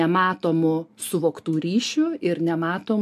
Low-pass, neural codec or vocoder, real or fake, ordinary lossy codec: 14.4 kHz; none; real; MP3, 64 kbps